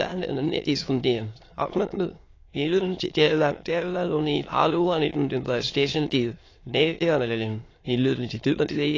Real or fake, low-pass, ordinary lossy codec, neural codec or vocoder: fake; 7.2 kHz; AAC, 32 kbps; autoencoder, 22.05 kHz, a latent of 192 numbers a frame, VITS, trained on many speakers